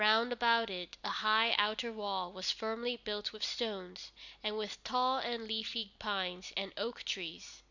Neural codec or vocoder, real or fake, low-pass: none; real; 7.2 kHz